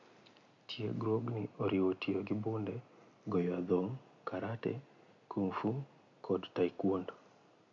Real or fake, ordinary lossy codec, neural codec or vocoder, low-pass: real; AAC, 64 kbps; none; 7.2 kHz